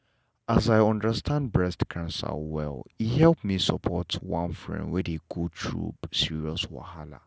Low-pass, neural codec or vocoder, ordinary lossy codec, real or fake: none; none; none; real